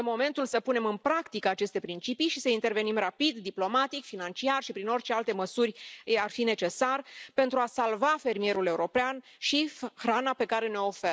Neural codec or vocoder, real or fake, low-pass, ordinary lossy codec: none; real; none; none